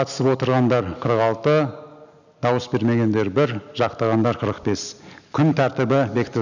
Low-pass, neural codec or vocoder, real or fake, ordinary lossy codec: 7.2 kHz; none; real; none